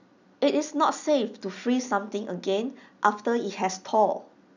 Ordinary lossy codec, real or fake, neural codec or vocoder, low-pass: none; real; none; 7.2 kHz